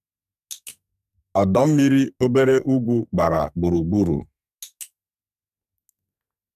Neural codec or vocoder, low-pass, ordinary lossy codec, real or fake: codec, 44.1 kHz, 2.6 kbps, SNAC; 14.4 kHz; none; fake